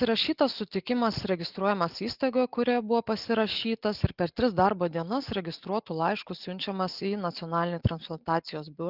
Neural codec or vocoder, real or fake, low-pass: none; real; 5.4 kHz